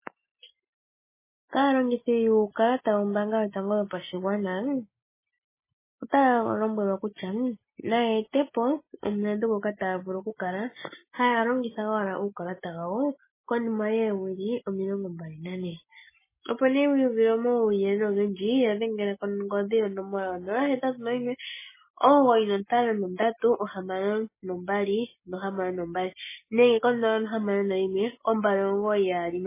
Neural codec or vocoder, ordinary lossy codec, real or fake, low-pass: none; MP3, 16 kbps; real; 3.6 kHz